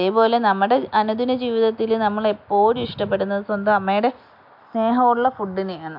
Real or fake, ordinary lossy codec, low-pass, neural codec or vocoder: real; MP3, 48 kbps; 5.4 kHz; none